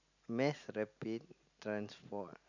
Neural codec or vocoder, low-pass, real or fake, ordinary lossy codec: none; 7.2 kHz; real; none